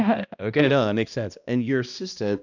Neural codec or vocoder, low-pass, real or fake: codec, 16 kHz, 1 kbps, X-Codec, HuBERT features, trained on balanced general audio; 7.2 kHz; fake